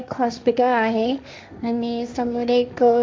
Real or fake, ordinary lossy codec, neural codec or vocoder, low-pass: fake; none; codec, 16 kHz, 1.1 kbps, Voila-Tokenizer; none